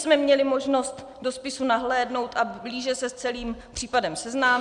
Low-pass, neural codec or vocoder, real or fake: 10.8 kHz; none; real